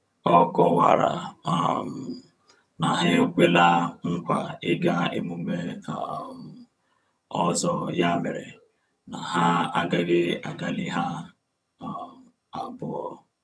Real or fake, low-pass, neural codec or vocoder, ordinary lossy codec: fake; none; vocoder, 22.05 kHz, 80 mel bands, HiFi-GAN; none